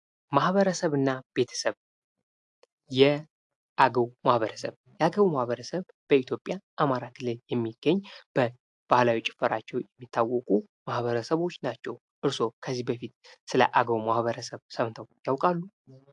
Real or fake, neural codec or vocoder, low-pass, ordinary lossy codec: real; none; 10.8 kHz; AAC, 64 kbps